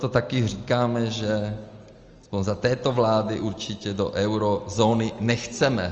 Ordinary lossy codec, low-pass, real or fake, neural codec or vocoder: Opus, 24 kbps; 7.2 kHz; real; none